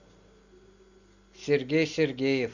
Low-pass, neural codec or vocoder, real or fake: 7.2 kHz; none; real